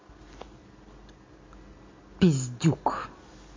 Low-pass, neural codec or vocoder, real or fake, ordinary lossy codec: 7.2 kHz; none; real; MP3, 32 kbps